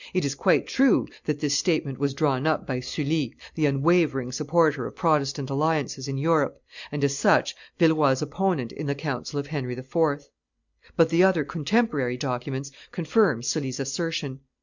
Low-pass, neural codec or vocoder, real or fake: 7.2 kHz; none; real